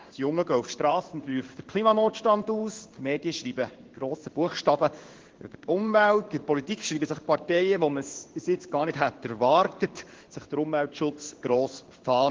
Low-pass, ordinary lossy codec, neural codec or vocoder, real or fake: 7.2 kHz; Opus, 16 kbps; codec, 16 kHz in and 24 kHz out, 1 kbps, XY-Tokenizer; fake